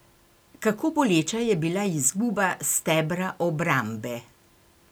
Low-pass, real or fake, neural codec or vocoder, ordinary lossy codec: none; real; none; none